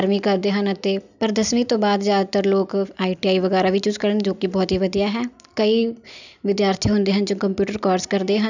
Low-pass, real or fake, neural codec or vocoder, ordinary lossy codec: 7.2 kHz; real; none; none